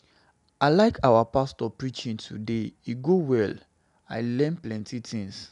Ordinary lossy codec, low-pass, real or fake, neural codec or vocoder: none; 10.8 kHz; real; none